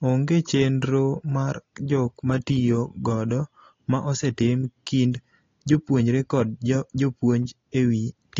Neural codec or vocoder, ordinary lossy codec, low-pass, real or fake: none; AAC, 32 kbps; 7.2 kHz; real